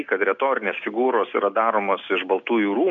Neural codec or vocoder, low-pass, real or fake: none; 7.2 kHz; real